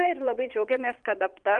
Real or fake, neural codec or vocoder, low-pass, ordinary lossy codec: fake; vocoder, 22.05 kHz, 80 mel bands, Vocos; 9.9 kHz; Opus, 24 kbps